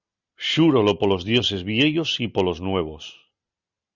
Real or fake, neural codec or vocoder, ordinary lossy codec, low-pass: real; none; Opus, 64 kbps; 7.2 kHz